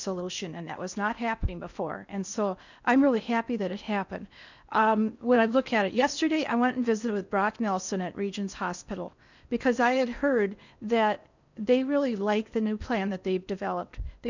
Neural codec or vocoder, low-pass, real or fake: codec, 16 kHz in and 24 kHz out, 0.8 kbps, FocalCodec, streaming, 65536 codes; 7.2 kHz; fake